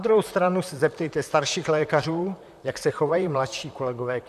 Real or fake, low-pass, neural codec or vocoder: fake; 14.4 kHz; vocoder, 44.1 kHz, 128 mel bands, Pupu-Vocoder